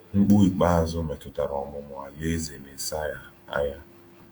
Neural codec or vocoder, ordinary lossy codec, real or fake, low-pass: autoencoder, 48 kHz, 128 numbers a frame, DAC-VAE, trained on Japanese speech; Opus, 64 kbps; fake; 19.8 kHz